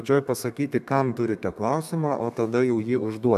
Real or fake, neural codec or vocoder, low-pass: fake; codec, 32 kHz, 1.9 kbps, SNAC; 14.4 kHz